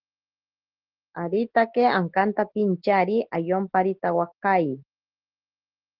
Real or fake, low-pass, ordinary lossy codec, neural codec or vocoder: real; 5.4 kHz; Opus, 16 kbps; none